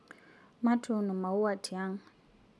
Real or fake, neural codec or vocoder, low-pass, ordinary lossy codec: real; none; none; none